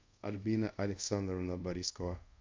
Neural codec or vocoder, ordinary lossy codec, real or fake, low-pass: codec, 24 kHz, 0.5 kbps, DualCodec; MP3, 64 kbps; fake; 7.2 kHz